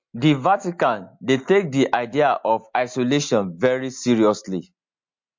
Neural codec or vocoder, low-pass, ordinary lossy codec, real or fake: none; 7.2 kHz; MP3, 48 kbps; real